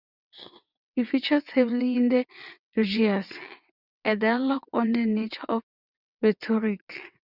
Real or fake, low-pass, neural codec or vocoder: fake; 5.4 kHz; vocoder, 22.05 kHz, 80 mel bands, WaveNeXt